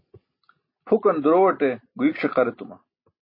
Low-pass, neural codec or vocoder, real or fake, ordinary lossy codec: 5.4 kHz; none; real; MP3, 24 kbps